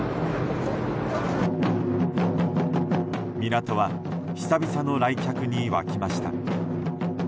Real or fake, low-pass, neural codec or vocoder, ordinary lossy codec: real; none; none; none